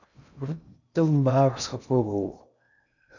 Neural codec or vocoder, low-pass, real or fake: codec, 16 kHz in and 24 kHz out, 0.6 kbps, FocalCodec, streaming, 2048 codes; 7.2 kHz; fake